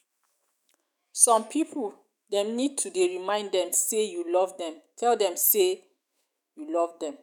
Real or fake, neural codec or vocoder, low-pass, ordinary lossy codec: fake; autoencoder, 48 kHz, 128 numbers a frame, DAC-VAE, trained on Japanese speech; none; none